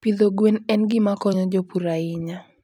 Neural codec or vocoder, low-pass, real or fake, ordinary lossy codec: vocoder, 44.1 kHz, 128 mel bands every 256 samples, BigVGAN v2; 19.8 kHz; fake; none